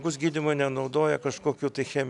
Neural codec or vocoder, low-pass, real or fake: none; 10.8 kHz; real